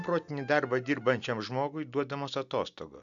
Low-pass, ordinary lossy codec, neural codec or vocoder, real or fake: 7.2 kHz; MP3, 96 kbps; none; real